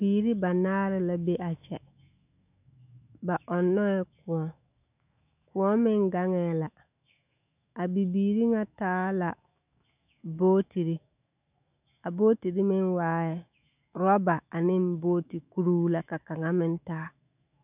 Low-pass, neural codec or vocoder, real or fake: 3.6 kHz; autoencoder, 48 kHz, 128 numbers a frame, DAC-VAE, trained on Japanese speech; fake